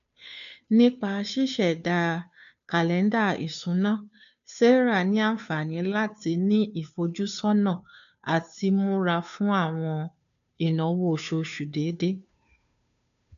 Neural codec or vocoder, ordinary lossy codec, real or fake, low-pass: codec, 16 kHz, 2 kbps, FunCodec, trained on Chinese and English, 25 frames a second; none; fake; 7.2 kHz